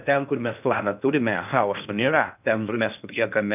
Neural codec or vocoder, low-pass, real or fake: codec, 16 kHz in and 24 kHz out, 0.6 kbps, FocalCodec, streaming, 2048 codes; 3.6 kHz; fake